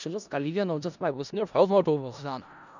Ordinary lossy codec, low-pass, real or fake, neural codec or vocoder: none; 7.2 kHz; fake; codec, 16 kHz in and 24 kHz out, 0.4 kbps, LongCat-Audio-Codec, four codebook decoder